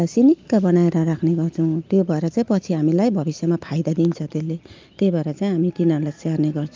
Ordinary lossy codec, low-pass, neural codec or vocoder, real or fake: Opus, 24 kbps; 7.2 kHz; none; real